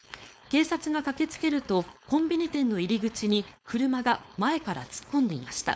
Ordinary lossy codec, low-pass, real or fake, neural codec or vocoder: none; none; fake; codec, 16 kHz, 4.8 kbps, FACodec